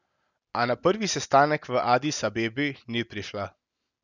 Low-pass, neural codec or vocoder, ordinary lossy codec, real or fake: 7.2 kHz; none; none; real